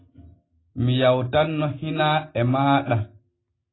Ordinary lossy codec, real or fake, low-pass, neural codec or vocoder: AAC, 16 kbps; real; 7.2 kHz; none